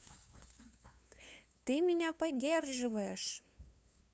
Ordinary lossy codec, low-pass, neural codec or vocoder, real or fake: none; none; codec, 16 kHz, 2 kbps, FunCodec, trained on LibriTTS, 25 frames a second; fake